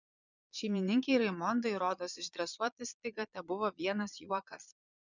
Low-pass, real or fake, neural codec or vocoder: 7.2 kHz; fake; vocoder, 22.05 kHz, 80 mel bands, Vocos